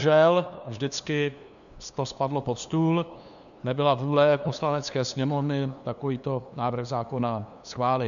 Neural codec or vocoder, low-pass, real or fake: codec, 16 kHz, 2 kbps, FunCodec, trained on LibriTTS, 25 frames a second; 7.2 kHz; fake